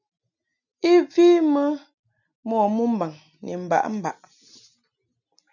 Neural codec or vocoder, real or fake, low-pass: none; real; 7.2 kHz